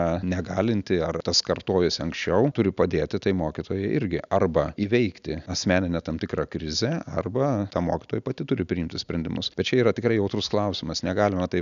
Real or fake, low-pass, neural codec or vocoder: real; 7.2 kHz; none